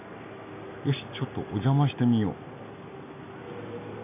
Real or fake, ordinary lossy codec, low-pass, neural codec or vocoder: real; none; 3.6 kHz; none